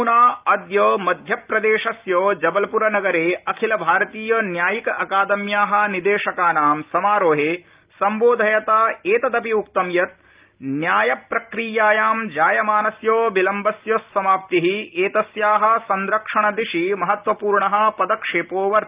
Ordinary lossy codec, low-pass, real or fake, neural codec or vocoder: Opus, 24 kbps; 3.6 kHz; real; none